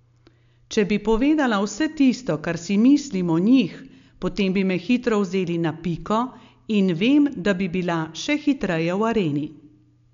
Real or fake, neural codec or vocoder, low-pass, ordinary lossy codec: real; none; 7.2 kHz; MP3, 64 kbps